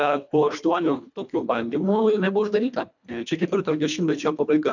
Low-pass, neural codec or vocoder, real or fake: 7.2 kHz; codec, 24 kHz, 1.5 kbps, HILCodec; fake